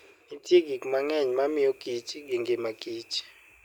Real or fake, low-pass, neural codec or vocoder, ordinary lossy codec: real; 19.8 kHz; none; none